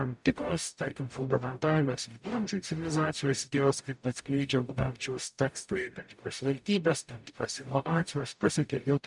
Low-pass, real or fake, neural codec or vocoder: 10.8 kHz; fake; codec, 44.1 kHz, 0.9 kbps, DAC